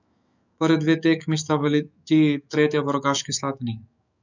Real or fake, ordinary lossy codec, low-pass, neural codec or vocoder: fake; none; 7.2 kHz; autoencoder, 48 kHz, 128 numbers a frame, DAC-VAE, trained on Japanese speech